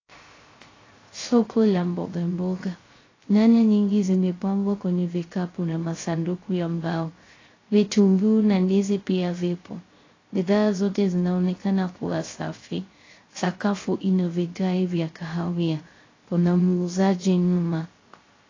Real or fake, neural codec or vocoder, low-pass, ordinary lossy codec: fake; codec, 16 kHz, 0.3 kbps, FocalCodec; 7.2 kHz; AAC, 32 kbps